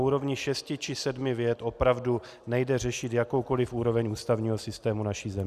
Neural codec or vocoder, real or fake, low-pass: none; real; 14.4 kHz